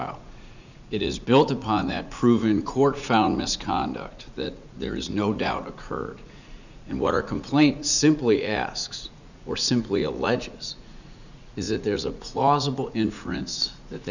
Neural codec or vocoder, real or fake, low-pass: vocoder, 44.1 kHz, 80 mel bands, Vocos; fake; 7.2 kHz